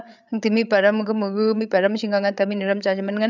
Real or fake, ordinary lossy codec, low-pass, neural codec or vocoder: fake; none; 7.2 kHz; codec, 16 kHz, 8 kbps, FreqCodec, larger model